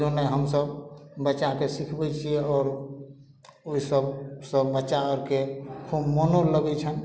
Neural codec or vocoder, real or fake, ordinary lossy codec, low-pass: none; real; none; none